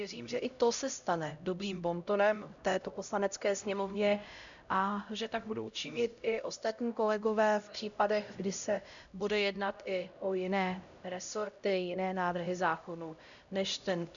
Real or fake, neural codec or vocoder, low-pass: fake; codec, 16 kHz, 0.5 kbps, X-Codec, HuBERT features, trained on LibriSpeech; 7.2 kHz